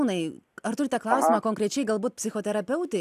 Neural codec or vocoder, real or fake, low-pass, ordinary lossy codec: none; real; 14.4 kHz; AAC, 96 kbps